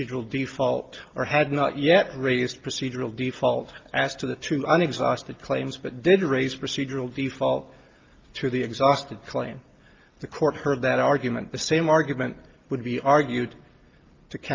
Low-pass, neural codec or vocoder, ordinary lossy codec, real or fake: 7.2 kHz; none; Opus, 24 kbps; real